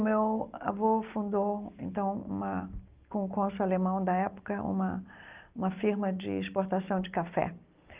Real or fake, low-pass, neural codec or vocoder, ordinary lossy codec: real; 3.6 kHz; none; Opus, 32 kbps